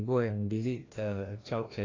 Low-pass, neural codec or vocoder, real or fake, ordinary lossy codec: 7.2 kHz; codec, 16 kHz, 1 kbps, FreqCodec, larger model; fake; AAC, 32 kbps